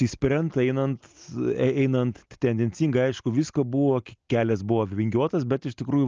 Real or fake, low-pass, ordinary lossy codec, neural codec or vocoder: real; 7.2 kHz; Opus, 24 kbps; none